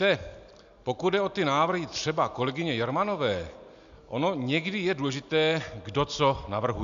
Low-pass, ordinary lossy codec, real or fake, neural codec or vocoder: 7.2 kHz; AAC, 96 kbps; real; none